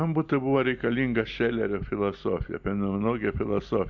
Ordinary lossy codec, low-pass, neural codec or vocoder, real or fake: Opus, 64 kbps; 7.2 kHz; none; real